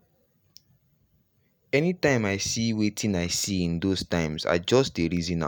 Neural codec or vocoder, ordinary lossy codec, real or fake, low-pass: none; none; real; none